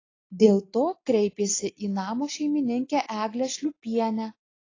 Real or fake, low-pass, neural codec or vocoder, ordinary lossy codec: real; 7.2 kHz; none; AAC, 32 kbps